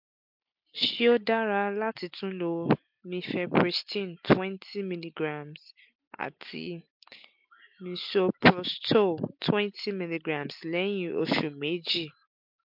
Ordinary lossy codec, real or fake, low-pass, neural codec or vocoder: MP3, 48 kbps; real; 5.4 kHz; none